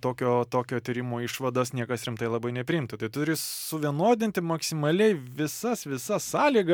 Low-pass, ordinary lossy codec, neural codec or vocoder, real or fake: 19.8 kHz; MP3, 96 kbps; none; real